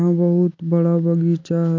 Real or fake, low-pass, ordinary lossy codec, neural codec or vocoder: real; 7.2 kHz; MP3, 48 kbps; none